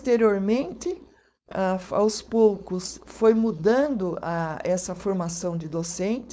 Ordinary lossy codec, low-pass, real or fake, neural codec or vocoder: none; none; fake; codec, 16 kHz, 4.8 kbps, FACodec